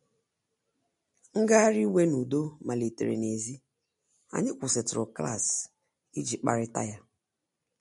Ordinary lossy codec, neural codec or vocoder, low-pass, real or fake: MP3, 48 kbps; none; 19.8 kHz; real